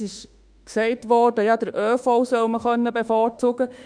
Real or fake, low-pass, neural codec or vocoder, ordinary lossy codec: fake; 9.9 kHz; autoencoder, 48 kHz, 32 numbers a frame, DAC-VAE, trained on Japanese speech; none